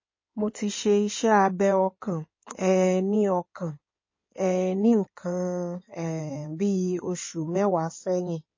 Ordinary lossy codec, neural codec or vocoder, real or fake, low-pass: MP3, 32 kbps; codec, 16 kHz in and 24 kHz out, 2.2 kbps, FireRedTTS-2 codec; fake; 7.2 kHz